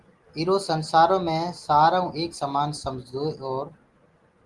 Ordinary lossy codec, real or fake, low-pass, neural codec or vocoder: Opus, 24 kbps; real; 10.8 kHz; none